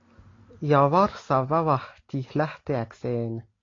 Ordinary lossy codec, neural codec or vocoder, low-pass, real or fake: AAC, 32 kbps; none; 7.2 kHz; real